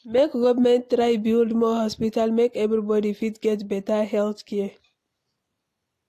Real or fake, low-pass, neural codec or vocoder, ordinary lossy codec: real; 14.4 kHz; none; AAC, 64 kbps